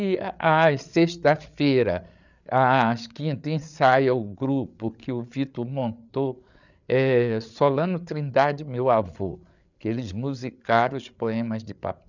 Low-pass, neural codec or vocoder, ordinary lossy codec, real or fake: 7.2 kHz; codec, 16 kHz, 8 kbps, FreqCodec, larger model; none; fake